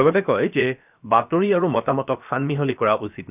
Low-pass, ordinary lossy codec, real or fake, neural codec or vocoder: 3.6 kHz; none; fake; codec, 16 kHz, about 1 kbps, DyCAST, with the encoder's durations